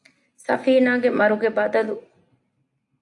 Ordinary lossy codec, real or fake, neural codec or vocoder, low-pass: AAC, 64 kbps; real; none; 10.8 kHz